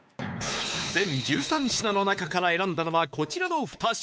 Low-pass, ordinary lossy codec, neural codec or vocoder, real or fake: none; none; codec, 16 kHz, 4 kbps, X-Codec, WavLM features, trained on Multilingual LibriSpeech; fake